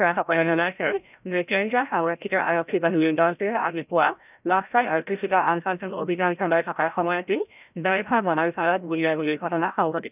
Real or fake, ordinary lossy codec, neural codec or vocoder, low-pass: fake; none; codec, 16 kHz, 0.5 kbps, FreqCodec, larger model; 3.6 kHz